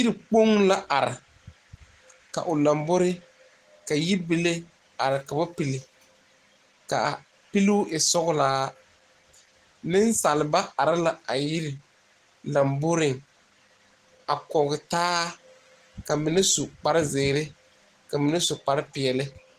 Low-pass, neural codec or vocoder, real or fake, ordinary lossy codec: 14.4 kHz; none; real; Opus, 16 kbps